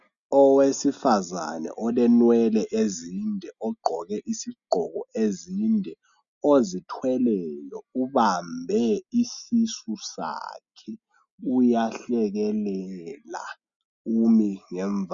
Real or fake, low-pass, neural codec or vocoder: real; 7.2 kHz; none